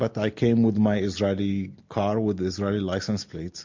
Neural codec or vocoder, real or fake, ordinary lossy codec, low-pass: none; real; MP3, 48 kbps; 7.2 kHz